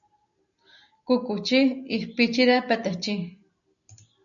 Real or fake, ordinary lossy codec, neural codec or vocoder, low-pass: real; MP3, 96 kbps; none; 7.2 kHz